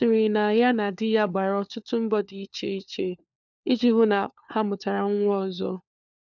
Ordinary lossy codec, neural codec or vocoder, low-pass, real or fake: none; codec, 16 kHz, 4 kbps, FunCodec, trained on LibriTTS, 50 frames a second; 7.2 kHz; fake